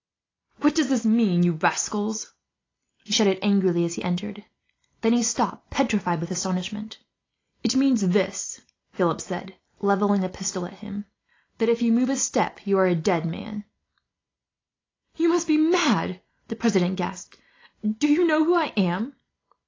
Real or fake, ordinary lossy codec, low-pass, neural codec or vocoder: real; AAC, 32 kbps; 7.2 kHz; none